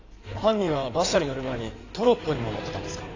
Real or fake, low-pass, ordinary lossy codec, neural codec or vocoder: fake; 7.2 kHz; AAC, 32 kbps; codec, 16 kHz in and 24 kHz out, 2.2 kbps, FireRedTTS-2 codec